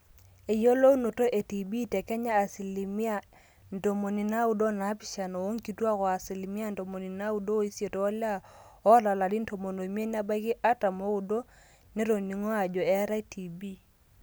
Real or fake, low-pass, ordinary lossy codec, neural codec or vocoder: real; none; none; none